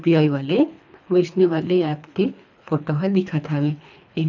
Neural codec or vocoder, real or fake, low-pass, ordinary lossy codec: codec, 24 kHz, 3 kbps, HILCodec; fake; 7.2 kHz; none